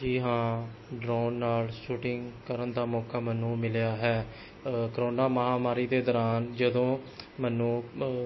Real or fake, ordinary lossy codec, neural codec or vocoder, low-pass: real; MP3, 24 kbps; none; 7.2 kHz